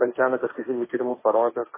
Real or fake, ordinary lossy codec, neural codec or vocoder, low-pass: fake; MP3, 16 kbps; codec, 16 kHz, 1.1 kbps, Voila-Tokenizer; 3.6 kHz